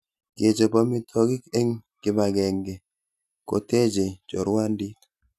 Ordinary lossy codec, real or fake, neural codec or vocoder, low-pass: none; real; none; 14.4 kHz